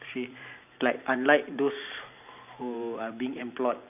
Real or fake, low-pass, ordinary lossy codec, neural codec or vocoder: fake; 3.6 kHz; none; vocoder, 44.1 kHz, 128 mel bands every 512 samples, BigVGAN v2